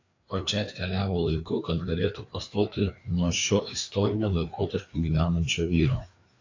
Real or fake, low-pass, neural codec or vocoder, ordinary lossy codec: fake; 7.2 kHz; codec, 16 kHz, 2 kbps, FreqCodec, larger model; AAC, 48 kbps